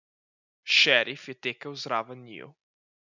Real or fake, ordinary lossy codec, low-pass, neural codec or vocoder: real; none; 7.2 kHz; none